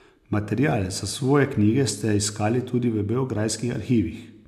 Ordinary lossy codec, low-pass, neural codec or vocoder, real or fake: none; 14.4 kHz; none; real